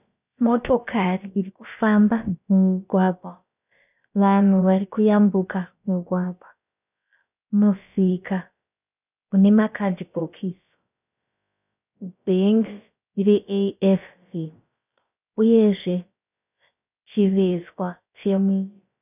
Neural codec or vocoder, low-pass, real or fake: codec, 16 kHz, about 1 kbps, DyCAST, with the encoder's durations; 3.6 kHz; fake